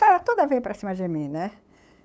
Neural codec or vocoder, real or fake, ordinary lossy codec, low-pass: codec, 16 kHz, 8 kbps, FunCodec, trained on LibriTTS, 25 frames a second; fake; none; none